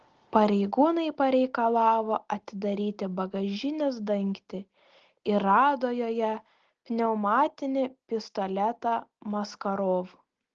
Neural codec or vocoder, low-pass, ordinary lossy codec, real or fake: none; 7.2 kHz; Opus, 16 kbps; real